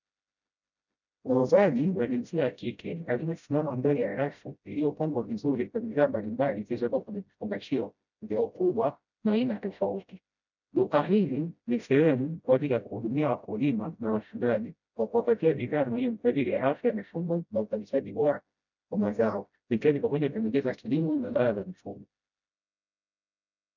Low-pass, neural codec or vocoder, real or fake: 7.2 kHz; codec, 16 kHz, 0.5 kbps, FreqCodec, smaller model; fake